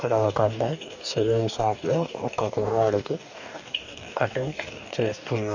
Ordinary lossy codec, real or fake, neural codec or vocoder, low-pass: none; fake; codec, 44.1 kHz, 2.6 kbps, DAC; 7.2 kHz